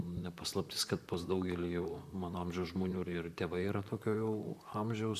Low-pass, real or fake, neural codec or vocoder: 14.4 kHz; fake; vocoder, 44.1 kHz, 128 mel bands, Pupu-Vocoder